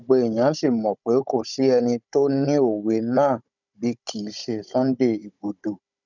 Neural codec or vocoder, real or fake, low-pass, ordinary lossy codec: codec, 16 kHz, 16 kbps, FunCodec, trained on Chinese and English, 50 frames a second; fake; 7.2 kHz; none